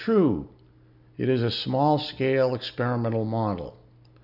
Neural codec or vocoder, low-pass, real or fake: none; 5.4 kHz; real